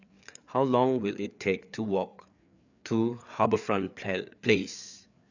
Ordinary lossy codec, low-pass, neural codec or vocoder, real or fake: none; 7.2 kHz; codec, 16 kHz, 4 kbps, FreqCodec, larger model; fake